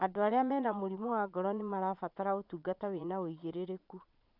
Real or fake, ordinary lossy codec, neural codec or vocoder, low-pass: fake; none; vocoder, 22.05 kHz, 80 mel bands, Vocos; 5.4 kHz